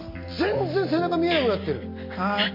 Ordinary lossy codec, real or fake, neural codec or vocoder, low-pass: MP3, 32 kbps; real; none; 5.4 kHz